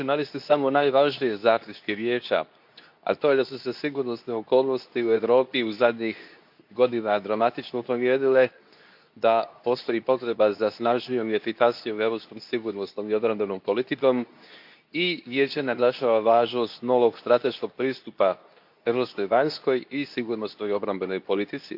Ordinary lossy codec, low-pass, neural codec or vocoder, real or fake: AAC, 48 kbps; 5.4 kHz; codec, 24 kHz, 0.9 kbps, WavTokenizer, medium speech release version 2; fake